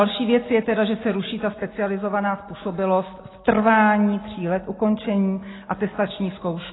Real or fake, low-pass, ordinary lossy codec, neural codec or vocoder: real; 7.2 kHz; AAC, 16 kbps; none